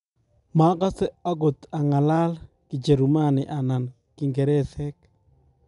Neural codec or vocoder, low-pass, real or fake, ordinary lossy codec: none; 10.8 kHz; real; none